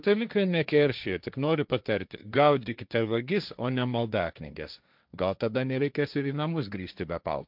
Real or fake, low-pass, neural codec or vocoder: fake; 5.4 kHz; codec, 16 kHz, 1.1 kbps, Voila-Tokenizer